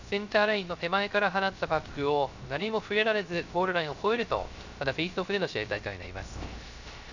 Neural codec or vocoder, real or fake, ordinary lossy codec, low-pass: codec, 16 kHz, 0.3 kbps, FocalCodec; fake; none; 7.2 kHz